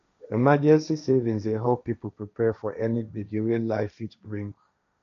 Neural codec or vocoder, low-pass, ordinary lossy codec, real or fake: codec, 16 kHz, 1.1 kbps, Voila-Tokenizer; 7.2 kHz; none; fake